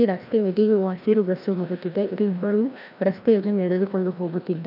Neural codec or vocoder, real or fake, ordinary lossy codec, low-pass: codec, 16 kHz, 1 kbps, FreqCodec, larger model; fake; none; 5.4 kHz